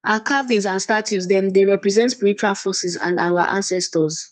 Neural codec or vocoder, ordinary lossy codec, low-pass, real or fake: codec, 32 kHz, 1.9 kbps, SNAC; none; 10.8 kHz; fake